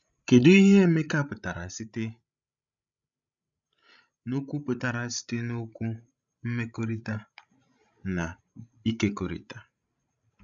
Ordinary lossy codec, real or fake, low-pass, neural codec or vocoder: none; fake; 7.2 kHz; codec, 16 kHz, 16 kbps, FreqCodec, larger model